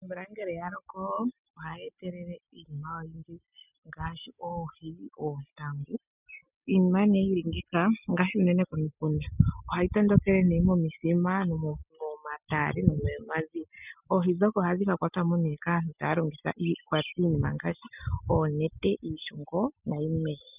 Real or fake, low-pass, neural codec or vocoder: real; 3.6 kHz; none